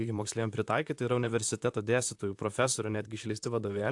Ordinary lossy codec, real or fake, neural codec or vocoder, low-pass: AAC, 64 kbps; fake; vocoder, 44.1 kHz, 128 mel bands, Pupu-Vocoder; 10.8 kHz